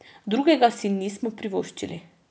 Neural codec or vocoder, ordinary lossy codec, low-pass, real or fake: none; none; none; real